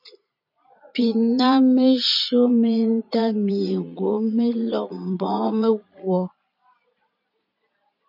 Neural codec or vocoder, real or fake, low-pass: vocoder, 22.05 kHz, 80 mel bands, Vocos; fake; 5.4 kHz